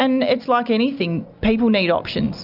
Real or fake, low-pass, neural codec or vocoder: real; 5.4 kHz; none